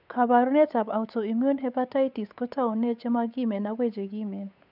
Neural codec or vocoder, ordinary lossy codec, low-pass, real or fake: codec, 16 kHz, 8 kbps, FunCodec, trained on Chinese and English, 25 frames a second; none; 5.4 kHz; fake